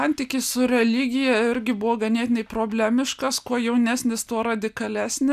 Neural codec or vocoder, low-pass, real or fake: none; 14.4 kHz; real